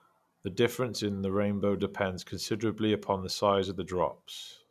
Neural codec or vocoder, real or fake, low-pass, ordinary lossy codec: none; real; 14.4 kHz; none